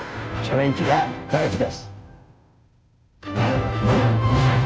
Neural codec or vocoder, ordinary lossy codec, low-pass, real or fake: codec, 16 kHz, 0.5 kbps, FunCodec, trained on Chinese and English, 25 frames a second; none; none; fake